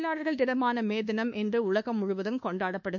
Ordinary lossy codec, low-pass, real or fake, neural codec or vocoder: none; 7.2 kHz; fake; codec, 24 kHz, 1.2 kbps, DualCodec